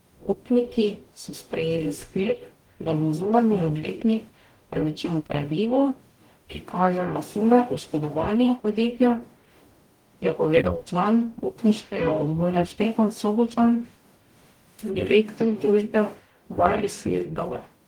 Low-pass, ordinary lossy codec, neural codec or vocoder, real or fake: 19.8 kHz; Opus, 24 kbps; codec, 44.1 kHz, 0.9 kbps, DAC; fake